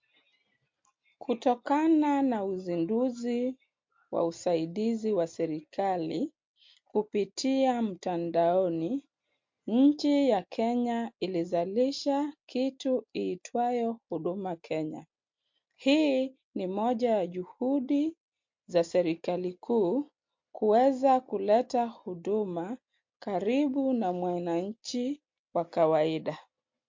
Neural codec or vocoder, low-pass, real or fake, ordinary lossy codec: none; 7.2 kHz; real; MP3, 48 kbps